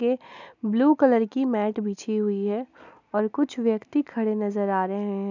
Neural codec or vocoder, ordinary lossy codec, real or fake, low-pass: none; none; real; 7.2 kHz